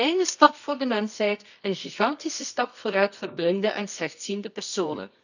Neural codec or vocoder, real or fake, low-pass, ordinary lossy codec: codec, 24 kHz, 0.9 kbps, WavTokenizer, medium music audio release; fake; 7.2 kHz; none